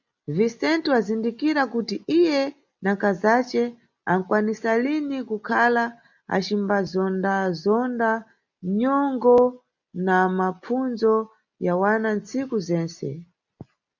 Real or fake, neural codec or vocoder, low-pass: real; none; 7.2 kHz